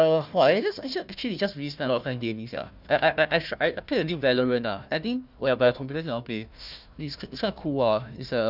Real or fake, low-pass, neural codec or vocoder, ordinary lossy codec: fake; 5.4 kHz; codec, 16 kHz, 1 kbps, FunCodec, trained on Chinese and English, 50 frames a second; none